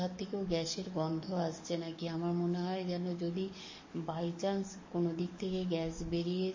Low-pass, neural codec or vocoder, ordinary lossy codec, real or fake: 7.2 kHz; codec, 44.1 kHz, 7.8 kbps, DAC; MP3, 32 kbps; fake